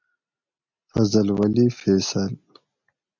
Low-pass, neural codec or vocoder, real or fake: 7.2 kHz; none; real